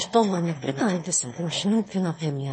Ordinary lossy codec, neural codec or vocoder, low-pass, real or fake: MP3, 32 kbps; autoencoder, 22.05 kHz, a latent of 192 numbers a frame, VITS, trained on one speaker; 9.9 kHz; fake